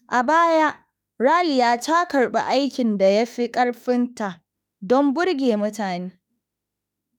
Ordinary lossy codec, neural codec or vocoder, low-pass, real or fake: none; autoencoder, 48 kHz, 32 numbers a frame, DAC-VAE, trained on Japanese speech; none; fake